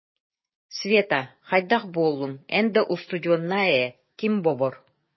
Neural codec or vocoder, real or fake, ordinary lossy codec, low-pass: codec, 16 kHz, 6 kbps, DAC; fake; MP3, 24 kbps; 7.2 kHz